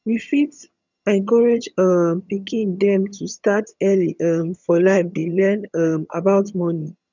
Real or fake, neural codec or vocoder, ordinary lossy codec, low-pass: fake; vocoder, 22.05 kHz, 80 mel bands, HiFi-GAN; none; 7.2 kHz